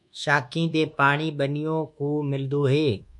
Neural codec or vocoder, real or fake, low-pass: codec, 24 kHz, 1.2 kbps, DualCodec; fake; 10.8 kHz